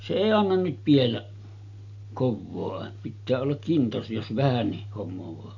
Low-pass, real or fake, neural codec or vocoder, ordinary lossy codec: 7.2 kHz; real; none; none